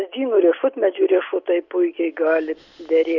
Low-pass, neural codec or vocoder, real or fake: 7.2 kHz; none; real